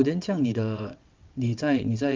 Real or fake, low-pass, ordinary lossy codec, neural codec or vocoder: fake; 7.2 kHz; Opus, 32 kbps; vocoder, 22.05 kHz, 80 mel bands, WaveNeXt